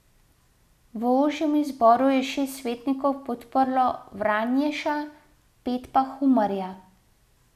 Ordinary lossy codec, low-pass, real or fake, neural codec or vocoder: none; 14.4 kHz; real; none